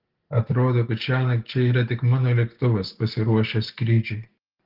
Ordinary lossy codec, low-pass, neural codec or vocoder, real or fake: Opus, 16 kbps; 5.4 kHz; none; real